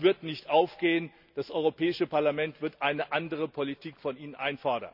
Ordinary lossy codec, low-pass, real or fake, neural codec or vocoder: none; 5.4 kHz; real; none